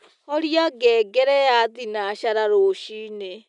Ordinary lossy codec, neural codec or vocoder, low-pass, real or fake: none; none; 10.8 kHz; real